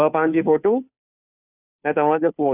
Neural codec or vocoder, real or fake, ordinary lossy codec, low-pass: codec, 16 kHz, 2 kbps, FunCodec, trained on Chinese and English, 25 frames a second; fake; none; 3.6 kHz